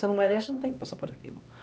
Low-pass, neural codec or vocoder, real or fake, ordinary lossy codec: none; codec, 16 kHz, 2 kbps, X-Codec, HuBERT features, trained on LibriSpeech; fake; none